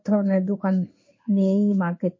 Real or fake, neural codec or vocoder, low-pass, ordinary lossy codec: fake; codec, 16 kHz in and 24 kHz out, 1 kbps, XY-Tokenizer; 7.2 kHz; MP3, 32 kbps